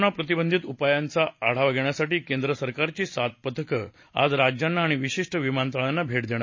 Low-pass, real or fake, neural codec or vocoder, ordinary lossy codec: 7.2 kHz; real; none; MP3, 32 kbps